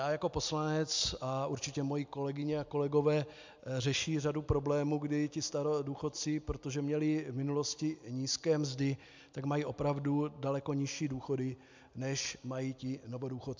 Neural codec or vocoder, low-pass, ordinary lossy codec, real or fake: none; 7.2 kHz; MP3, 64 kbps; real